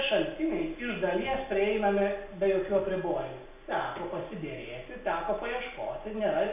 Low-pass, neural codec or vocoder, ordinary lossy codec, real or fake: 3.6 kHz; none; AAC, 32 kbps; real